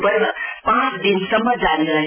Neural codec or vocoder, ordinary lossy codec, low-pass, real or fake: none; none; 3.6 kHz; real